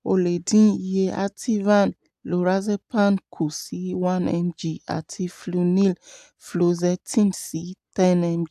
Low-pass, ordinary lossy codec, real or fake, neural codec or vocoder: 14.4 kHz; AAC, 96 kbps; real; none